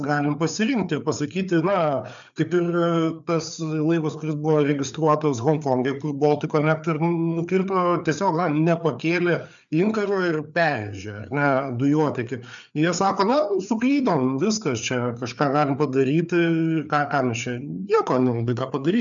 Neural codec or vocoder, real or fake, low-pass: codec, 16 kHz, 4 kbps, FreqCodec, larger model; fake; 7.2 kHz